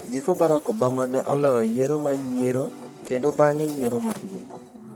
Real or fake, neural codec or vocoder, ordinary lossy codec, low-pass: fake; codec, 44.1 kHz, 1.7 kbps, Pupu-Codec; none; none